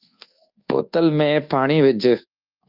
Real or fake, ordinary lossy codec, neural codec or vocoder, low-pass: fake; Opus, 24 kbps; codec, 24 kHz, 1.2 kbps, DualCodec; 5.4 kHz